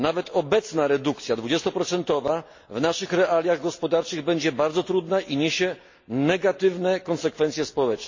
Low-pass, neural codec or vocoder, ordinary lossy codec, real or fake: 7.2 kHz; none; MP3, 32 kbps; real